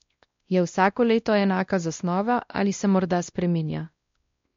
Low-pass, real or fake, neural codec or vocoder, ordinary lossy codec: 7.2 kHz; fake; codec, 16 kHz, 1 kbps, X-Codec, WavLM features, trained on Multilingual LibriSpeech; MP3, 48 kbps